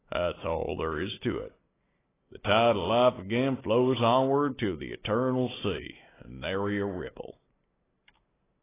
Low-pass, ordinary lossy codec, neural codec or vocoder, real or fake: 3.6 kHz; AAC, 16 kbps; none; real